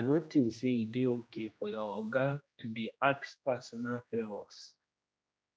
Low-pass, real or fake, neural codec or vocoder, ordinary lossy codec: none; fake; codec, 16 kHz, 1 kbps, X-Codec, HuBERT features, trained on general audio; none